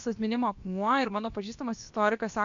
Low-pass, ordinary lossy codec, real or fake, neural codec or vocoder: 7.2 kHz; MP3, 64 kbps; fake; codec, 16 kHz, about 1 kbps, DyCAST, with the encoder's durations